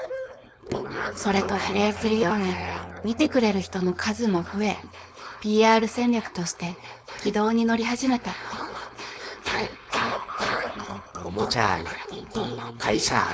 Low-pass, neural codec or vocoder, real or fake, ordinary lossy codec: none; codec, 16 kHz, 4.8 kbps, FACodec; fake; none